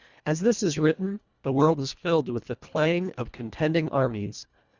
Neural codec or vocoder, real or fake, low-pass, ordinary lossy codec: codec, 24 kHz, 1.5 kbps, HILCodec; fake; 7.2 kHz; Opus, 64 kbps